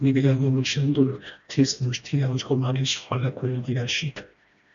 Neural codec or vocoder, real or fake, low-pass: codec, 16 kHz, 1 kbps, FreqCodec, smaller model; fake; 7.2 kHz